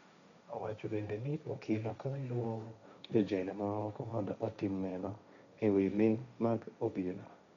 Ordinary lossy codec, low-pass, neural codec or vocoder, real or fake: none; 7.2 kHz; codec, 16 kHz, 1.1 kbps, Voila-Tokenizer; fake